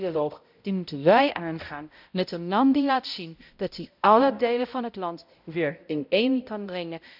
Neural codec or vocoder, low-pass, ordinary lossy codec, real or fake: codec, 16 kHz, 0.5 kbps, X-Codec, HuBERT features, trained on balanced general audio; 5.4 kHz; none; fake